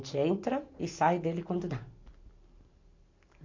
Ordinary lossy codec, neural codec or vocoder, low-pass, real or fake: MP3, 48 kbps; none; 7.2 kHz; real